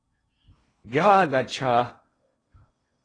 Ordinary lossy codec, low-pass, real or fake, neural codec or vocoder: AAC, 32 kbps; 9.9 kHz; fake; codec, 16 kHz in and 24 kHz out, 0.8 kbps, FocalCodec, streaming, 65536 codes